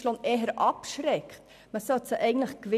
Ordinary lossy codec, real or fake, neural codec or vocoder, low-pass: none; real; none; 14.4 kHz